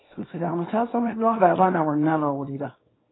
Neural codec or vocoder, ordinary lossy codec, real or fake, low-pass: codec, 24 kHz, 0.9 kbps, WavTokenizer, small release; AAC, 16 kbps; fake; 7.2 kHz